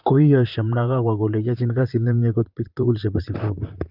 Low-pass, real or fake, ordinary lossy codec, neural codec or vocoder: 5.4 kHz; fake; Opus, 24 kbps; vocoder, 44.1 kHz, 128 mel bands, Pupu-Vocoder